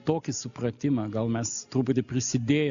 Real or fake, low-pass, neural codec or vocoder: real; 7.2 kHz; none